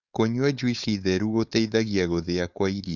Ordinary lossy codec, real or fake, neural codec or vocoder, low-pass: none; fake; codec, 16 kHz, 4.8 kbps, FACodec; 7.2 kHz